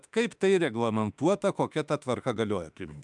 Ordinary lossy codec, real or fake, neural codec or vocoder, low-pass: MP3, 96 kbps; fake; autoencoder, 48 kHz, 32 numbers a frame, DAC-VAE, trained on Japanese speech; 10.8 kHz